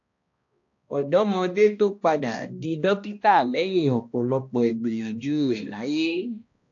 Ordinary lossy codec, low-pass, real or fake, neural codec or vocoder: AAC, 48 kbps; 7.2 kHz; fake; codec, 16 kHz, 1 kbps, X-Codec, HuBERT features, trained on balanced general audio